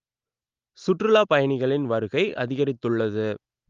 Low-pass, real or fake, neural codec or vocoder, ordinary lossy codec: 7.2 kHz; real; none; Opus, 32 kbps